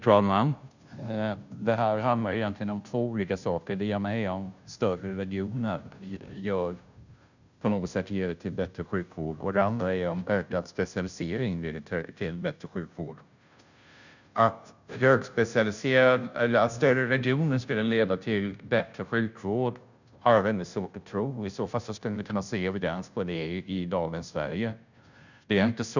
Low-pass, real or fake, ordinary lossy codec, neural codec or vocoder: 7.2 kHz; fake; none; codec, 16 kHz, 0.5 kbps, FunCodec, trained on Chinese and English, 25 frames a second